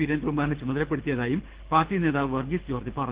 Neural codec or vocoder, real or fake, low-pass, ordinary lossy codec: codec, 24 kHz, 6 kbps, HILCodec; fake; 3.6 kHz; Opus, 16 kbps